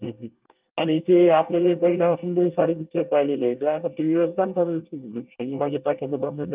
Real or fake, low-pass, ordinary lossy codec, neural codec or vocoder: fake; 3.6 kHz; Opus, 24 kbps; codec, 24 kHz, 1 kbps, SNAC